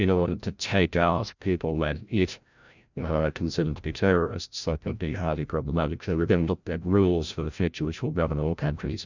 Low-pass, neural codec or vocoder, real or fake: 7.2 kHz; codec, 16 kHz, 0.5 kbps, FreqCodec, larger model; fake